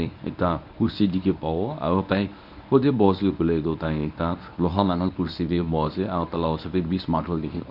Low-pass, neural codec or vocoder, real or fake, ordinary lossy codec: 5.4 kHz; codec, 24 kHz, 0.9 kbps, WavTokenizer, medium speech release version 1; fake; none